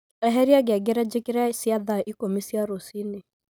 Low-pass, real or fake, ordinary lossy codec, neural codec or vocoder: none; real; none; none